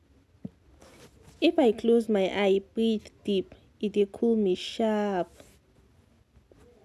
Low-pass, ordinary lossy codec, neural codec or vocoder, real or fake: none; none; none; real